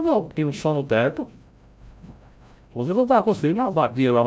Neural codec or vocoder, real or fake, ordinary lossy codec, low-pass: codec, 16 kHz, 0.5 kbps, FreqCodec, larger model; fake; none; none